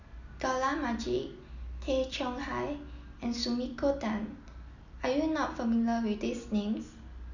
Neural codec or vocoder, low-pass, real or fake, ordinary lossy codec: none; 7.2 kHz; real; none